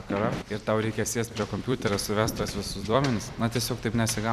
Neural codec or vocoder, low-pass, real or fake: vocoder, 44.1 kHz, 128 mel bands every 256 samples, BigVGAN v2; 14.4 kHz; fake